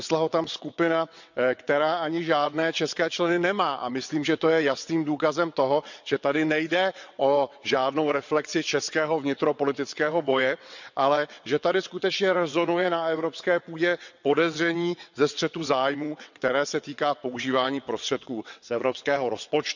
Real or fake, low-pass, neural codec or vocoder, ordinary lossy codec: fake; 7.2 kHz; vocoder, 22.05 kHz, 80 mel bands, WaveNeXt; none